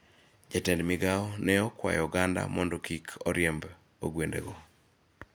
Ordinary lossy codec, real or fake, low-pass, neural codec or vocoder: none; real; none; none